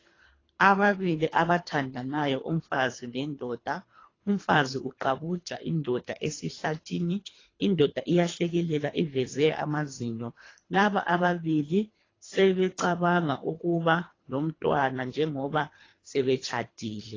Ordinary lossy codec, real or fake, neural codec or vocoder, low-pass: AAC, 32 kbps; fake; codec, 24 kHz, 3 kbps, HILCodec; 7.2 kHz